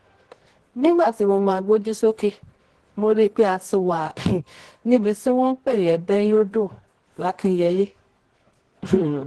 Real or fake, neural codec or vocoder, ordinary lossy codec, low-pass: fake; codec, 24 kHz, 0.9 kbps, WavTokenizer, medium music audio release; Opus, 16 kbps; 10.8 kHz